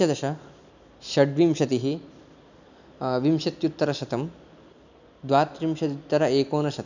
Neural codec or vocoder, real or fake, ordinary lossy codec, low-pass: none; real; none; 7.2 kHz